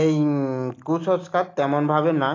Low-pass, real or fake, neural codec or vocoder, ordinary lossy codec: 7.2 kHz; real; none; AAC, 32 kbps